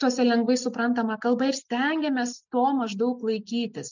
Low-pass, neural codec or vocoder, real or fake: 7.2 kHz; none; real